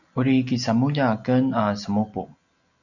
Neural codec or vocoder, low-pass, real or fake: none; 7.2 kHz; real